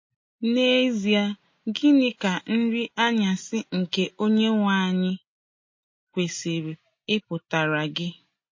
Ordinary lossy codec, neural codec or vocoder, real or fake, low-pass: MP3, 32 kbps; none; real; 7.2 kHz